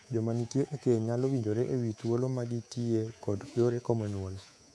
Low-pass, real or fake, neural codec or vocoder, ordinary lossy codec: none; fake; codec, 24 kHz, 3.1 kbps, DualCodec; none